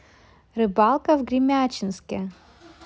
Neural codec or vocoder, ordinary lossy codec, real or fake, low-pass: none; none; real; none